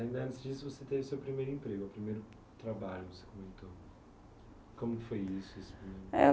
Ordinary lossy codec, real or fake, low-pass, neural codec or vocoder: none; real; none; none